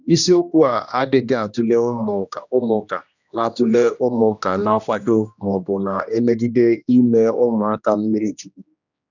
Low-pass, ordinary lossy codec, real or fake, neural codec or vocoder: 7.2 kHz; none; fake; codec, 16 kHz, 1 kbps, X-Codec, HuBERT features, trained on general audio